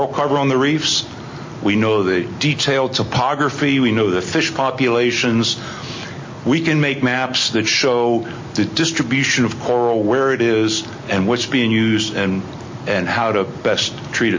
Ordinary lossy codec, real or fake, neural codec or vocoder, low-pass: MP3, 32 kbps; real; none; 7.2 kHz